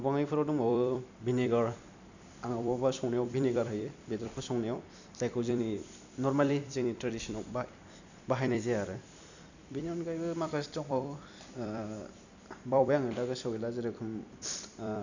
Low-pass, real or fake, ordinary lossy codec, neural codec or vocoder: 7.2 kHz; fake; none; vocoder, 44.1 kHz, 128 mel bands every 256 samples, BigVGAN v2